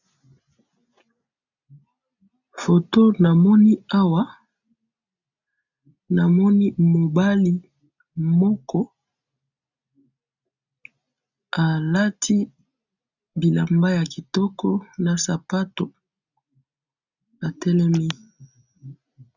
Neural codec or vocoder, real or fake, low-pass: none; real; 7.2 kHz